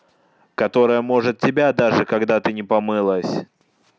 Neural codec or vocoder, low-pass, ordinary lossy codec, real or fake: none; none; none; real